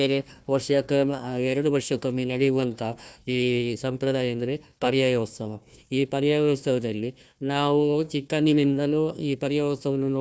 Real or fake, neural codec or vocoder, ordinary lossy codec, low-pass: fake; codec, 16 kHz, 1 kbps, FunCodec, trained on Chinese and English, 50 frames a second; none; none